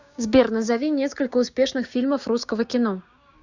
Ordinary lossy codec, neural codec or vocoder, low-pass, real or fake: Opus, 64 kbps; codec, 16 kHz, 6 kbps, DAC; 7.2 kHz; fake